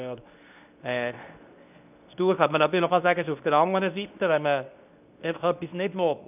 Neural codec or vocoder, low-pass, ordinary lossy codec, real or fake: codec, 24 kHz, 0.9 kbps, WavTokenizer, medium speech release version 2; 3.6 kHz; none; fake